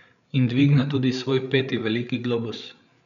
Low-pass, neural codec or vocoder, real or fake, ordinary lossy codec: 7.2 kHz; codec, 16 kHz, 8 kbps, FreqCodec, larger model; fake; none